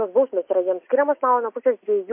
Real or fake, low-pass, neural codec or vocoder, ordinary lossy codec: real; 3.6 kHz; none; MP3, 24 kbps